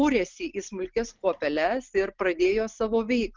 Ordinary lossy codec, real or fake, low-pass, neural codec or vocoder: Opus, 32 kbps; real; 7.2 kHz; none